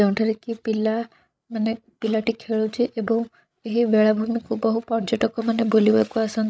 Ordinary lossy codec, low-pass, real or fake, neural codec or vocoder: none; none; fake; codec, 16 kHz, 16 kbps, FreqCodec, larger model